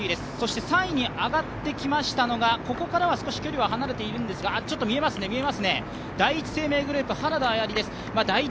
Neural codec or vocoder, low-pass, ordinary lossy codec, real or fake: none; none; none; real